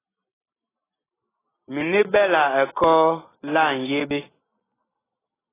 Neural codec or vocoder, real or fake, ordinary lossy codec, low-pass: none; real; AAC, 16 kbps; 3.6 kHz